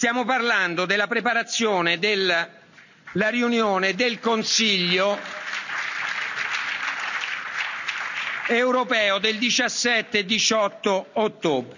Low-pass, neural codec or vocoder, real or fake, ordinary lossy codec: 7.2 kHz; none; real; none